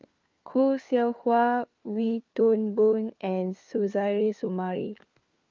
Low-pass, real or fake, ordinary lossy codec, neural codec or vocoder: 7.2 kHz; fake; Opus, 24 kbps; codec, 16 kHz, 4 kbps, FunCodec, trained on LibriTTS, 50 frames a second